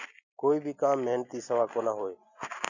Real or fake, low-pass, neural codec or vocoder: real; 7.2 kHz; none